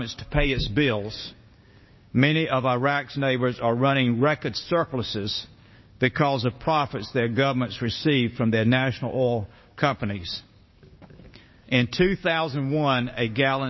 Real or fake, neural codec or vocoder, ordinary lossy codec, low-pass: fake; codec, 16 kHz, 6 kbps, DAC; MP3, 24 kbps; 7.2 kHz